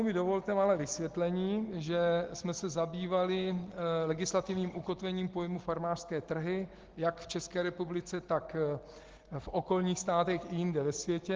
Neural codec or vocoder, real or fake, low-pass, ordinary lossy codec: none; real; 7.2 kHz; Opus, 16 kbps